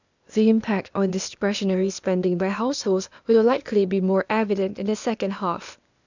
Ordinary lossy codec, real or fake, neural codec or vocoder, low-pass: none; fake; codec, 16 kHz, 0.8 kbps, ZipCodec; 7.2 kHz